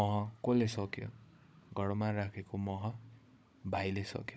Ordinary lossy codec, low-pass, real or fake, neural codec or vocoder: none; none; fake; codec, 16 kHz, 16 kbps, FunCodec, trained on Chinese and English, 50 frames a second